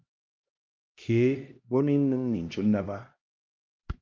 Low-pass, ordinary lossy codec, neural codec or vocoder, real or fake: 7.2 kHz; Opus, 32 kbps; codec, 16 kHz, 1 kbps, X-Codec, HuBERT features, trained on LibriSpeech; fake